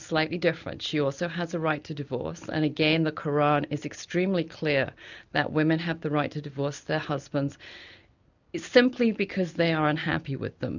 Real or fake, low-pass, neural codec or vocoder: real; 7.2 kHz; none